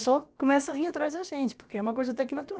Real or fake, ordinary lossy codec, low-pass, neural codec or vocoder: fake; none; none; codec, 16 kHz, about 1 kbps, DyCAST, with the encoder's durations